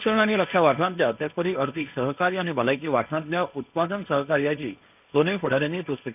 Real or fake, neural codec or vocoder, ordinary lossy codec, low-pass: fake; codec, 16 kHz, 1.1 kbps, Voila-Tokenizer; none; 3.6 kHz